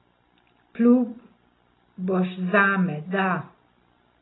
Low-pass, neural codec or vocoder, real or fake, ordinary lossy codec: 7.2 kHz; none; real; AAC, 16 kbps